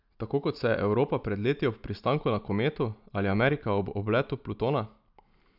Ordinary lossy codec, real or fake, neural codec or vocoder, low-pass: none; real; none; 5.4 kHz